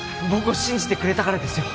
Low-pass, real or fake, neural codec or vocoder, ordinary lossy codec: none; real; none; none